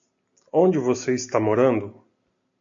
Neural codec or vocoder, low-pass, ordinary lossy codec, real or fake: none; 7.2 kHz; AAC, 64 kbps; real